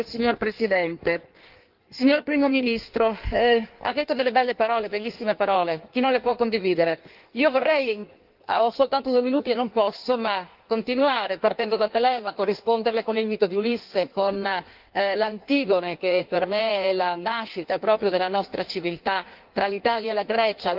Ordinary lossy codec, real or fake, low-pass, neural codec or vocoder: Opus, 32 kbps; fake; 5.4 kHz; codec, 16 kHz in and 24 kHz out, 1.1 kbps, FireRedTTS-2 codec